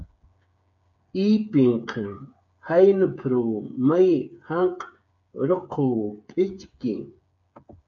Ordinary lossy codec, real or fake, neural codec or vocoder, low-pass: MP3, 96 kbps; fake; codec, 16 kHz, 8 kbps, FreqCodec, smaller model; 7.2 kHz